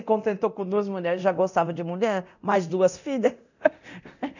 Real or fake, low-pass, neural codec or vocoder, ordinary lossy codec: fake; 7.2 kHz; codec, 24 kHz, 0.9 kbps, DualCodec; none